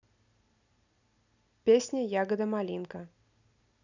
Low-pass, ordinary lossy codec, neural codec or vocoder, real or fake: 7.2 kHz; none; none; real